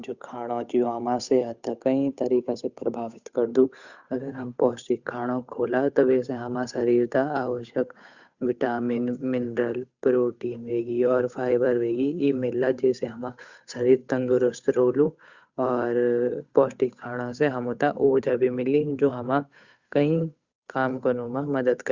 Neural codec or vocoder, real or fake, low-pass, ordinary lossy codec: codec, 16 kHz, 8 kbps, FunCodec, trained on Chinese and English, 25 frames a second; fake; 7.2 kHz; Opus, 64 kbps